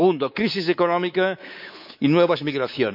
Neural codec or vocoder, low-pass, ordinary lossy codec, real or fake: codec, 24 kHz, 3.1 kbps, DualCodec; 5.4 kHz; none; fake